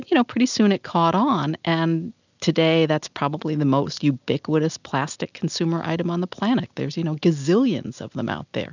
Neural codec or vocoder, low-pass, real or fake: none; 7.2 kHz; real